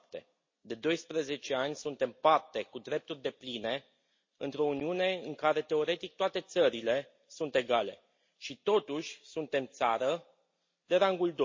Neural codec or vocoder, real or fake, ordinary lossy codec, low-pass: none; real; MP3, 32 kbps; 7.2 kHz